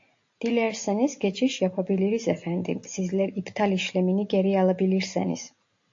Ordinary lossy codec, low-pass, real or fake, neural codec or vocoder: AAC, 48 kbps; 7.2 kHz; real; none